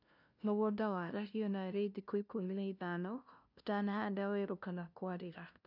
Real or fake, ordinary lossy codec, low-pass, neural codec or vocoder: fake; none; 5.4 kHz; codec, 16 kHz, 0.5 kbps, FunCodec, trained on LibriTTS, 25 frames a second